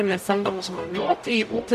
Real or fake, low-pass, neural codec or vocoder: fake; 14.4 kHz; codec, 44.1 kHz, 0.9 kbps, DAC